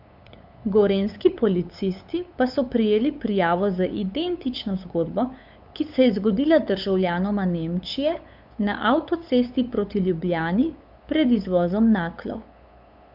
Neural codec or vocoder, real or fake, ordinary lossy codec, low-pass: codec, 16 kHz, 8 kbps, FunCodec, trained on LibriTTS, 25 frames a second; fake; AAC, 48 kbps; 5.4 kHz